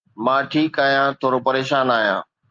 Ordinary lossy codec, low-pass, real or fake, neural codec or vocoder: Opus, 24 kbps; 7.2 kHz; real; none